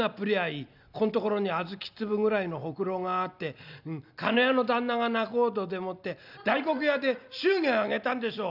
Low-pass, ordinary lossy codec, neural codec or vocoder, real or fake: 5.4 kHz; none; none; real